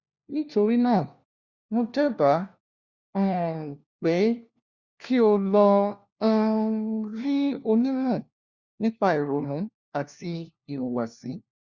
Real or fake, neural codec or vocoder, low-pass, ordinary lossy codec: fake; codec, 16 kHz, 1 kbps, FunCodec, trained on LibriTTS, 50 frames a second; 7.2 kHz; Opus, 64 kbps